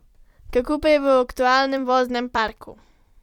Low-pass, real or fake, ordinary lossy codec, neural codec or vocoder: 19.8 kHz; fake; none; vocoder, 44.1 kHz, 128 mel bands every 256 samples, BigVGAN v2